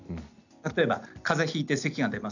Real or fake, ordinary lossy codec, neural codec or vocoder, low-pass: real; none; none; 7.2 kHz